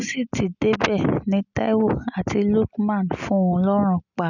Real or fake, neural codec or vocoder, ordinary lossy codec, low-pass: real; none; none; 7.2 kHz